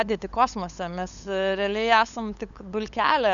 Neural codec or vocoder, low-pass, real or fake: codec, 16 kHz, 8 kbps, FunCodec, trained on LibriTTS, 25 frames a second; 7.2 kHz; fake